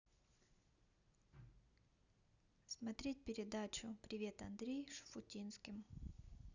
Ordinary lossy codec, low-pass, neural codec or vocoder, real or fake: none; 7.2 kHz; none; real